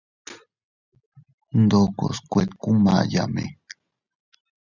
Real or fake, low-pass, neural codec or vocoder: real; 7.2 kHz; none